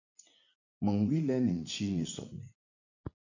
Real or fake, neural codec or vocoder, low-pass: fake; vocoder, 44.1 kHz, 80 mel bands, Vocos; 7.2 kHz